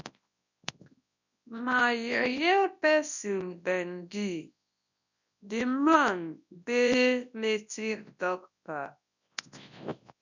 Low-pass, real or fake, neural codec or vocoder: 7.2 kHz; fake; codec, 24 kHz, 0.9 kbps, WavTokenizer, large speech release